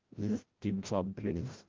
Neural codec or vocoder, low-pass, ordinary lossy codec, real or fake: codec, 16 kHz, 0.5 kbps, FreqCodec, larger model; 7.2 kHz; Opus, 16 kbps; fake